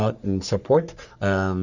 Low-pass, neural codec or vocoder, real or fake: 7.2 kHz; codec, 44.1 kHz, 3.4 kbps, Pupu-Codec; fake